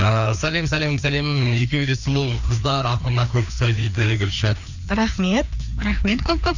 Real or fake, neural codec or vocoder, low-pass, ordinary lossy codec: fake; codec, 16 kHz, 2 kbps, FreqCodec, larger model; 7.2 kHz; none